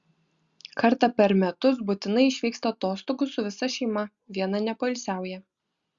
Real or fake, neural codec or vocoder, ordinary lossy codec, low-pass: real; none; Opus, 64 kbps; 7.2 kHz